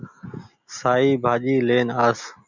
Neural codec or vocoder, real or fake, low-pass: none; real; 7.2 kHz